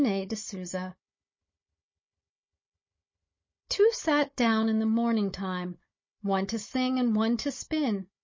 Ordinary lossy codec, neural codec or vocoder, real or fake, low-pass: MP3, 32 kbps; codec, 16 kHz, 16 kbps, FunCodec, trained on Chinese and English, 50 frames a second; fake; 7.2 kHz